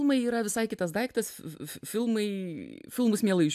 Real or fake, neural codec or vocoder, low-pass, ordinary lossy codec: real; none; 14.4 kHz; AAC, 96 kbps